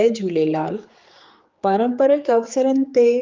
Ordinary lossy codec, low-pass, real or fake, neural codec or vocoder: Opus, 24 kbps; 7.2 kHz; fake; codec, 16 kHz, 4 kbps, X-Codec, HuBERT features, trained on general audio